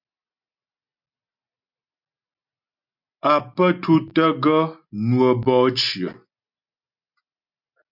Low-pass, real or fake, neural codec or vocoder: 5.4 kHz; real; none